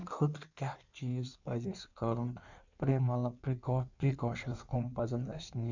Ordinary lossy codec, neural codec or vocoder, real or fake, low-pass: none; codec, 16 kHz in and 24 kHz out, 1.1 kbps, FireRedTTS-2 codec; fake; 7.2 kHz